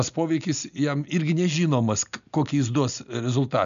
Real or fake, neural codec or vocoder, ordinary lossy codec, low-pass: real; none; AAC, 96 kbps; 7.2 kHz